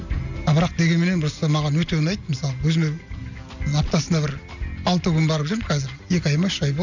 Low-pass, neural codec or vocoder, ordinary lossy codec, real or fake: 7.2 kHz; none; none; real